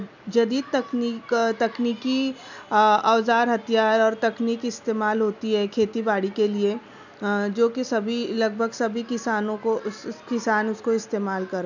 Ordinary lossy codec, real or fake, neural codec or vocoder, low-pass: none; real; none; 7.2 kHz